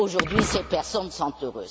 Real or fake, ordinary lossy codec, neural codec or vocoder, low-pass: real; none; none; none